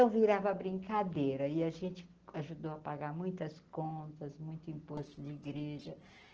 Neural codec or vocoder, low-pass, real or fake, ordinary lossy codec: none; 7.2 kHz; real; Opus, 16 kbps